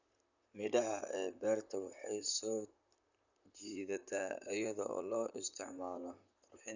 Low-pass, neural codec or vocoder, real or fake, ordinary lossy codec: 7.2 kHz; vocoder, 44.1 kHz, 128 mel bands, Pupu-Vocoder; fake; none